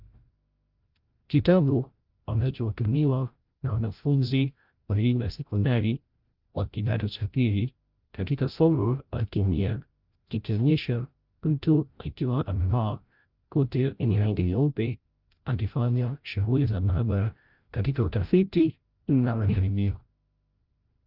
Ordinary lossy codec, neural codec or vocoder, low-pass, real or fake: Opus, 32 kbps; codec, 16 kHz, 0.5 kbps, FreqCodec, larger model; 5.4 kHz; fake